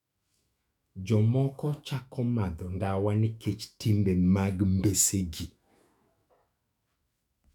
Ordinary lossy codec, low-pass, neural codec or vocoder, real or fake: none; 19.8 kHz; autoencoder, 48 kHz, 128 numbers a frame, DAC-VAE, trained on Japanese speech; fake